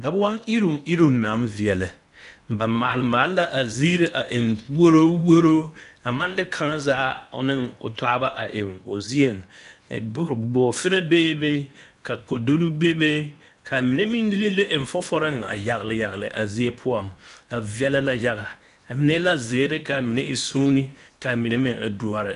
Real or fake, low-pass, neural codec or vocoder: fake; 10.8 kHz; codec, 16 kHz in and 24 kHz out, 0.8 kbps, FocalCodec, streaming, 65536 codes